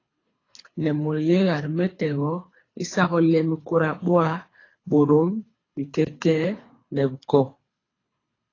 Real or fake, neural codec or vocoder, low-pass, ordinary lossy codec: fake; codec, 24 kHz, 3 kbps, HILCodec; 7.2 kHz; AAC, 32 kbps